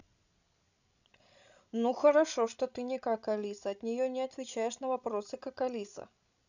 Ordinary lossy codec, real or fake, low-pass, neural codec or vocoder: none; fake; 7.2 kHz; codec, 16 kHz, 16 kbps, FreqCodec, larger model